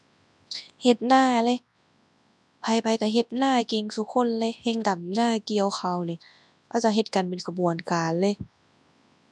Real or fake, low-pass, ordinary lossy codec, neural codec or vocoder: fake; none; none; codec, 24 kHz, 0.9 kbps, WavTokenizer, large speech release